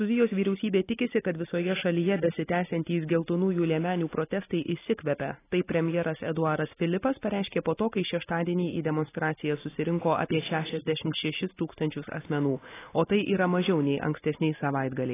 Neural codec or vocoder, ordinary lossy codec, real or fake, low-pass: none; AAC, 16 kbps; real; 3.6 kHz